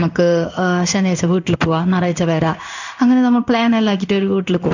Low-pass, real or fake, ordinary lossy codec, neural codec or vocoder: 7.2 kHz; fake; none; codec, 16 kHz in and 24 kHz out, 1 kbps, XY-Tokenizer